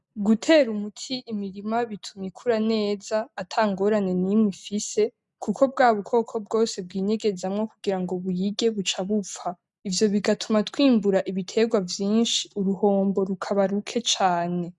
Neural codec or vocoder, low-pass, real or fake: none; 10.8 kHz; real